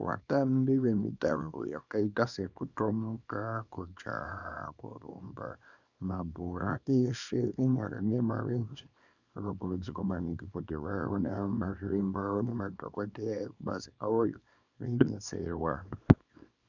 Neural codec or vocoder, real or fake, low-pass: codec, 24 kHz, 0.9 kbps, WavTokenizer, small release; fake; 7.2 kHz